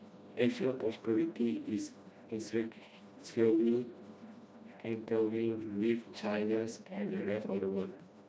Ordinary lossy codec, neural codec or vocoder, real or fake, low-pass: none; codec, 16 kHz, 1 kbps, FreqCodec, smaller model; fake; none